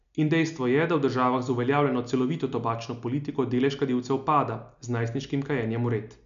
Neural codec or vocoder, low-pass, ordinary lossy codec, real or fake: none; 7.2 kHz; none; real